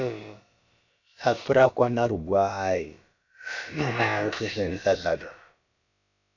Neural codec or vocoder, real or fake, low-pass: codec, 16 kHz, about 1 kbps, DyCAST, with the encoder's durations; fake; 7.2 kHz